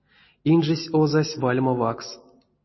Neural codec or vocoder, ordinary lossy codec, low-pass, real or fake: none; MP3, 24 kbps; 7.2 kHz; real